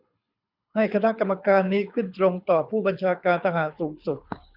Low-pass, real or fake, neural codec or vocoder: 5.4 kHz; fake; codec, 24 kHz, 6 kbps, HILCodec